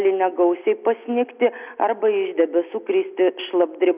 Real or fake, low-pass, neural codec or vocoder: real; 3.6 kHz; none